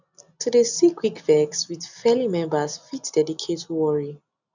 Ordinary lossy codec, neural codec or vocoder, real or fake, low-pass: none; none; real; 7.2 kHz